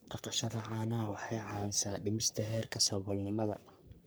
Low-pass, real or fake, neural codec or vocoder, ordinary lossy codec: none; fake; codec, 44.1 kHz, 3.4 kbps, Pupu-Codec; none